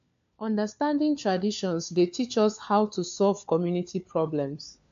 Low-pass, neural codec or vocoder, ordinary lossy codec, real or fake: 7.2 kHz; codec, 16 kHz, 4 kbps, FunCodec, trained on LibriTTS, 50 frames a second; AAC, 64 kbps; fake